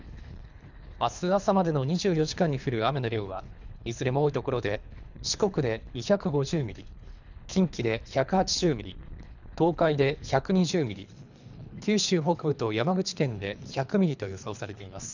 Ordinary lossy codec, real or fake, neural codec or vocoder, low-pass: none; fake; codec, 24 kHz, 3 kbps, HILCodec; 7.2 kHz